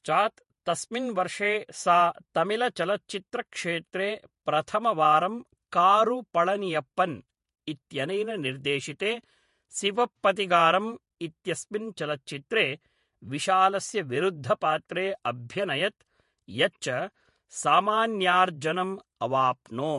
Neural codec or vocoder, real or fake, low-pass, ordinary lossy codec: vocoder, 48 kHz, 128 mel bands, Vocos; fake; 14.4 kHz; MP3, 48 kbps